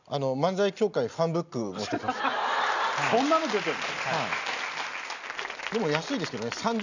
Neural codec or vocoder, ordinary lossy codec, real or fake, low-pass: none; none; real; 7.2 kHz